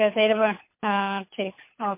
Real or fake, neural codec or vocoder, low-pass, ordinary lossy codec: fake; codec, 24 kHz, 6 kbps, HILCodec; 3.6 kHz; MP3, 24 kbps